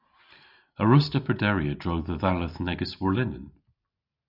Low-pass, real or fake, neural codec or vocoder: 5.4 kHz; real; none